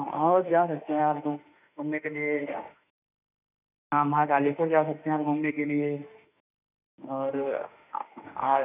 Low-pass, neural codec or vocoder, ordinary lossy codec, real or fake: 3.6 kHz; autoencoder, 48 kHz, 32 numbers a frame, DAC-VAE, trained on Japanese speech; none; fake